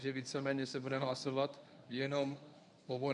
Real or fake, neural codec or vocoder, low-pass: fake; codec, 24 kHz, 0.9 kbps, WavTokenizer, medium speech release version 1; 10.8 kHz